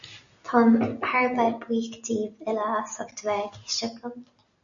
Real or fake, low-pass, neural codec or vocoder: real; 7.2 kHz; none